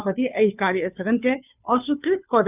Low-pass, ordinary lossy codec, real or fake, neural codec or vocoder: 3.6 kHz; none; fake; codec, 16 kHz, 2 kbps, FunCodec, trained on Chinese and English, 25 frames a second